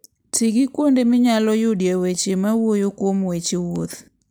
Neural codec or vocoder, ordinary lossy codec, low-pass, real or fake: none; none; none; real